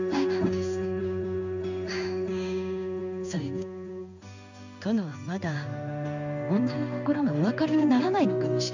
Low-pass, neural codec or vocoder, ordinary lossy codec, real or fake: 7.2 kHz; codec, 16 kHz in and 24 kHz out, 1 kbps, XY-Tokenizer; none; fake